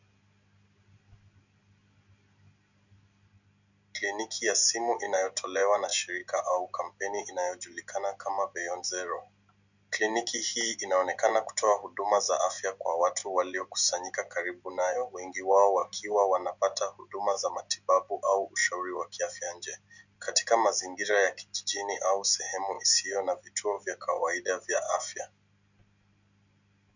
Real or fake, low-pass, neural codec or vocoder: real; 7.2 kHz; none